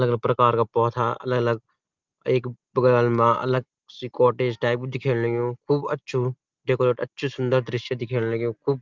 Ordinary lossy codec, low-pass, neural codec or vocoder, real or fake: Opus, 32 kbps; 7.2 kHz; none; real